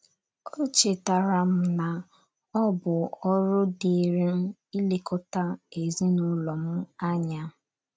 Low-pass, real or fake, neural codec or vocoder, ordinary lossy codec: none; real; none; none